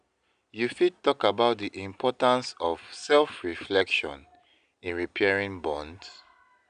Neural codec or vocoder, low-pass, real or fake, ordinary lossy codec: none; 9.9 kHz; real; none